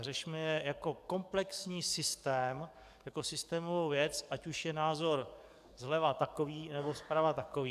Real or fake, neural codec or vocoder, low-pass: fake; codec, 44.1 kHz, 7.8 kbps, DAC; 14.4 kHz